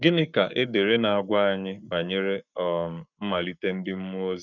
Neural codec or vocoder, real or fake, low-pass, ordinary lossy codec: codec, 44.1 kHz, 7.8 kbps, Pupu-Codec; fake; 7.2 kHz; none